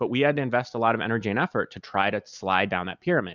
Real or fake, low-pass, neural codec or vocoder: real; 7.2 kHz; none